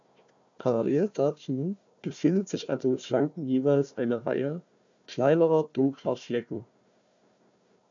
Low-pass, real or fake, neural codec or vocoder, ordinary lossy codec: 7.2 kHz; fake; codec, 16 kHz, 1 kbps, FunCodec, trained on Chinese and English, 50 frames a second; AAC, 64 kbps